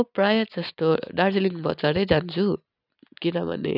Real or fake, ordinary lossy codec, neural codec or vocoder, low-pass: real; none; none; 5.4 kHz